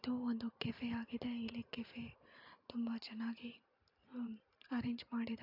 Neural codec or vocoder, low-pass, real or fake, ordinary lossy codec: vocoder, 44.1 kHz, 128 mel bands every 512 samples, BigVGAN v2; 5.4 kHz; fake; AAC, 48 kbps